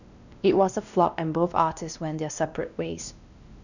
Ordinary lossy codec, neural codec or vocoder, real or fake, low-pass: none; codec, 16 kHz, 1 kbps, X-Codec, WavLM features, trained on Multilingual LibriSpeech; fake; 7.2 kHz